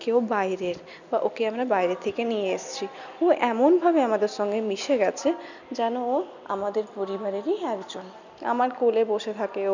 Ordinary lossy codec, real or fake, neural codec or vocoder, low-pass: none; real; none; 7.2 kHz